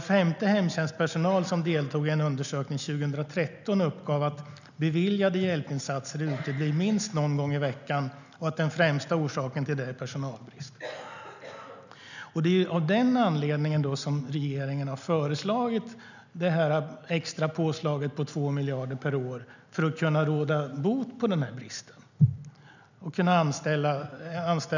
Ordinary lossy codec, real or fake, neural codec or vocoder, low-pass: none; real; none; 7.2 kHz